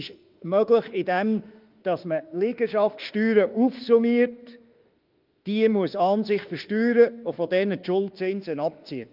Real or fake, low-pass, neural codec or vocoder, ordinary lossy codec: fake; 5.4 kHz; autoencoder, 48 kHz, 32 numbers a frame, DAC-VAE, trained on Japanese speech; Opus, 32 kbps